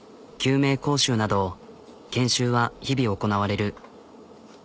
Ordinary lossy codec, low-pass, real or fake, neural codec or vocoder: none; none; real; none